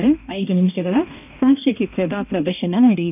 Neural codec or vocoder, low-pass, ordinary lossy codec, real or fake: codec, 16 kHz, 1 kbps, X-Codec, HuBERT features, trained on balanced general audio; 3.6 kHz; none; fake